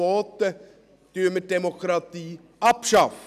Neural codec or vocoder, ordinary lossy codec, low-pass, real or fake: none; none; 14.4 kHz; real